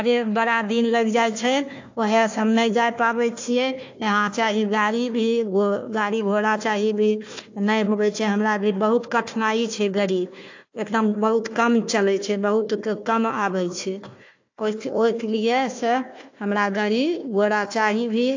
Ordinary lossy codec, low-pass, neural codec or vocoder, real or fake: AAC, 48 kbps; 7.2 kHz; codec, 16 kHz, 1 kbps, FunCodec, trained on Chinese and English, 50 frames a second; fake